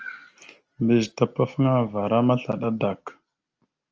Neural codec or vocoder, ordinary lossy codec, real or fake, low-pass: none; Opus, 24 kbps; real; 7.2 kHz